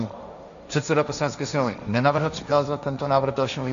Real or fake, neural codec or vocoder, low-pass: fake; codec, 16 kHz, 1.1 kbps, Voila-Tokenizer; 7.2 kHz